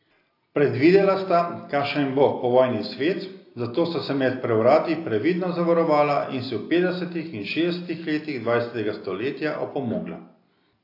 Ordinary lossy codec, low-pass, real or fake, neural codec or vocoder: AAC, 32 kbps; 5.4 kHz; real; none